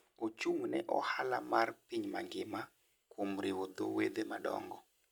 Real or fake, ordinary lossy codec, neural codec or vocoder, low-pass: real; none; none; none